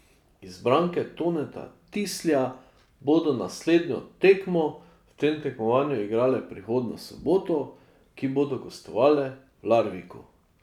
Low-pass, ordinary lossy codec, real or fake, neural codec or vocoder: 19.8 kHz; none; real; none